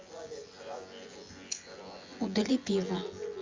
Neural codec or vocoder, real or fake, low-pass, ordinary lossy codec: vocoder, 24 kHz, 100 mel bands, Vocos; fake; 7.2 kHz; Opus, 32 kbps